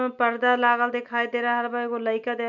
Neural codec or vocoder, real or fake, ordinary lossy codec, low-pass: none; real; none; 7.2 kHz